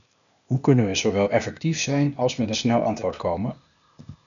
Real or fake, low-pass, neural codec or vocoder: fake; 7.2 kHz; codec, 16 kHz, 0.8 kbps, ZipCodec